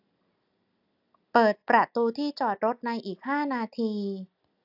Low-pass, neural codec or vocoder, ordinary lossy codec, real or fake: 5.4 kHz; none; AAC, 48 kbps; real